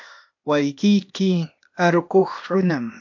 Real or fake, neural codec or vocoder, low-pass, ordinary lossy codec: fake; codec, 16 kHz, 0.8 kbps, ZipCodec; 7.2 kHz; MP3, 48 kbps